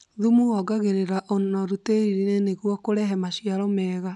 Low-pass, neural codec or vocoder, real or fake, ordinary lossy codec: 10.8 kHz; none; real; none